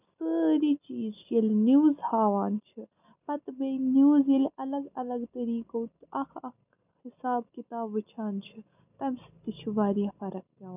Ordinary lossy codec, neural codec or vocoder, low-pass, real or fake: none; none; 3.6 kHz; real